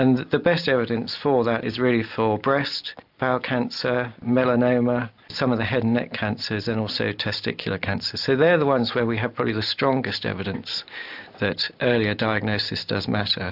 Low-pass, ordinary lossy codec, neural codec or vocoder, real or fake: 5.4 kHz; AAC, 48 kbps; none; real